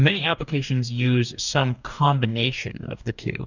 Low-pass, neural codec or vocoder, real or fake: 7.2 kHz; codec, 44.1 kHz, 2.6 kbps, DAC; fake